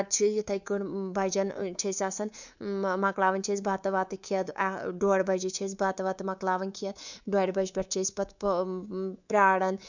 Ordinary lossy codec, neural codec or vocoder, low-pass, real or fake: none; codec, 24 kHz, 3.1 kbps, DualCodec; 7.2 kHz; fake